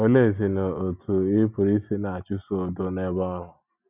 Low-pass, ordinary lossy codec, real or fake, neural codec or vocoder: 3.6 kHz; none; real; none